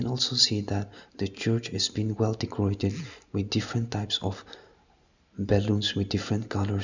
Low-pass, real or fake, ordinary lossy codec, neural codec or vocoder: 7.2 kHz; real; none; none